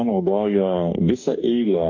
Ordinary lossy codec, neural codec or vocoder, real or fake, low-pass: AAC, 48 kbps; codec, 44.1 kHz, 2.6 kbps, DAC; fake; 7.2 kHz